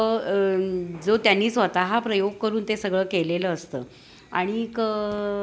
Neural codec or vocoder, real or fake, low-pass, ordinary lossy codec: none; real; none; none